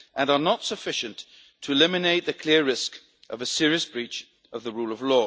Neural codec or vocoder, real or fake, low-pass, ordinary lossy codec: none; real; none; none